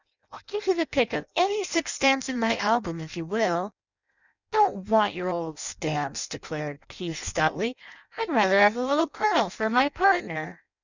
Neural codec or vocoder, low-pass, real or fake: codec, 16 kHz in and 24 kHz out, 0.6 kbps, FireRedTTS-2 codec; 7.2 kHz; fake